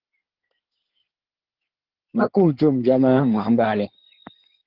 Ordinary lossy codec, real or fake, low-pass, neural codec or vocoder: Opus, 16 kbps; fake; 5.4 kHz; codec, 16 kHz in and 24 kHz out, 2.2 kbps, FireRedTTS-2 codec